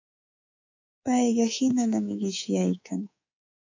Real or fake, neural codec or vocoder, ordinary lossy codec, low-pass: fake; codec, 24 kHz, 3.1 kbps, DualCodec; AAC, 48 kbps; 7.2 kHz